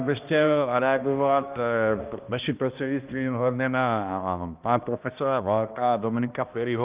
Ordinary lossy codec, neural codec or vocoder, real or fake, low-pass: Opus, 24 kbps; codec, 16 kHz, 1 kbps, X-Codec, HuBERT features, trained on balanced general audio; fake; 3.6 kHz